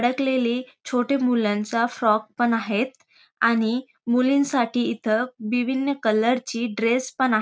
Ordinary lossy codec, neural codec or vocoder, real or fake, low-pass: none; none; real; none